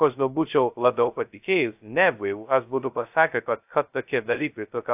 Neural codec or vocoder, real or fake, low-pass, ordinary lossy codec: codec, 16 kHz, 0.2 kbps, FocalCodec; fake; 3.6 kHz; AAC, 32 kbps